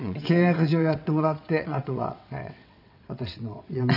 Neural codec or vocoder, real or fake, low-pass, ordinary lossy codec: vocoder, 22.05 kHz, 80 mel bands, WaveNeXt; fake; 5.4 kHz; none